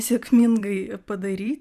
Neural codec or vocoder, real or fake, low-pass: vocoder, 44.1 kHz, 128 mel bands every 256 samples, BigVGAN v2; fake; 14.4 kHz